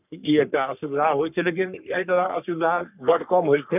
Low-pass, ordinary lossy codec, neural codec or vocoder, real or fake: 3.6 kHz; none; codec, 44.1 kHz, 3.4 kbps, Pupu-Codec; fake